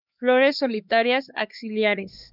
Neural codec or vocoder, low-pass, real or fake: codec, 16 kHz, 2 kbps, X-Codec, HuBERT features, trained on LibriSpeech; 5.4 kHz; fake